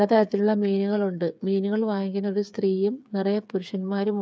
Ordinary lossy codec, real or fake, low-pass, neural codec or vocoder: none; fake; none; codec, 16 kHz, 8 kbps, FreqCodec, smaller model